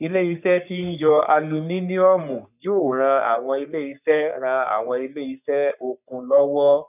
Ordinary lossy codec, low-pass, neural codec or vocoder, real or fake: none; 3.6 kHz; codec, 44.1 kHz, 3.4 kbps, Pupu-Codec; fake